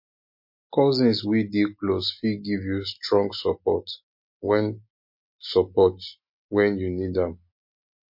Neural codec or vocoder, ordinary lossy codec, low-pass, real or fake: none; MP3, 32 kbps; 5.4 kHz; real